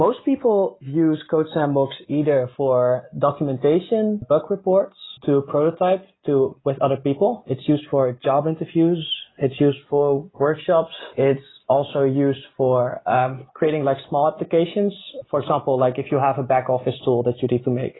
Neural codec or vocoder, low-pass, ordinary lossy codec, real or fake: none; 7.2 kHz; AAC, 16 kbps; real